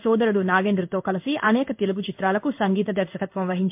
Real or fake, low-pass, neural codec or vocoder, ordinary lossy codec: fake; 3.6 kHz; codec, 16 kHz in and 24 kHz out, 1 kbps, XY-Tokenizer; MP3, 32 kbps